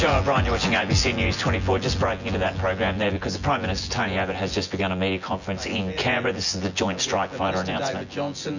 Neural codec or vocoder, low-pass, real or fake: vocoder, 24 kHz, 100 mel bands, Vocos; 7.2 kHz; fake